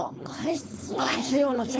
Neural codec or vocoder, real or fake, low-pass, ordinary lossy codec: codec, 16 kHz, 4.8 kbps, FACodec; fake; none; none